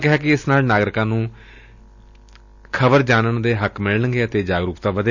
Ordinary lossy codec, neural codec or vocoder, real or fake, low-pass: none; none; real; 7.2 kHz